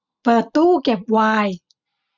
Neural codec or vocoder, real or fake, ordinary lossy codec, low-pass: none; real; none; 7.2 kHz